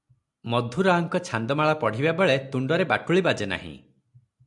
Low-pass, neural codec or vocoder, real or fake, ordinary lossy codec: 10.8 kHz; none; real; MP3, 96 kbps